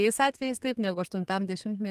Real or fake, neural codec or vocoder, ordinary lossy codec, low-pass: fake; codec, 32 kHz, 1.9 kbps, SNAC; Opus, 32 kbps; 14.4 kHz